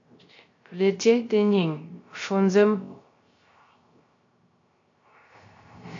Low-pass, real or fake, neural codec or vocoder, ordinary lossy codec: 7.2 kHz; fake; codec, 16 kHz, 0.3 kbps, FocalCodec; AAC, 48 kbps